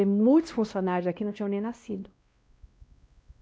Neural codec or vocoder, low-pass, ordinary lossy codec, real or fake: codec, 16 kHz, 1 kbps, X-Codec, WavLM features, trained on Multilingual LibriSpeech; none; none; fake